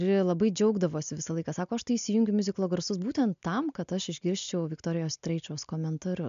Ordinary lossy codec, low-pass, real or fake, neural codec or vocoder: MP3, 64 kbps; 7.2 kHz; real; none